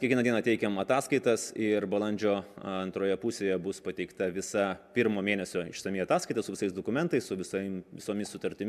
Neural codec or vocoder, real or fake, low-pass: none; real; 14.4 kHz